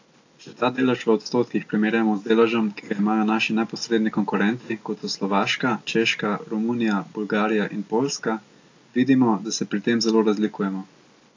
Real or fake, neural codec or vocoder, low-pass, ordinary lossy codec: real; none; 7.2 kHz; AAC, 48 kbps